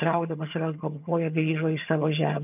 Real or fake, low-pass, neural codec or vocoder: fake; 3.6 kHz; vocoder, 22.05 kHz, 80 mel bands, HiFi-GAN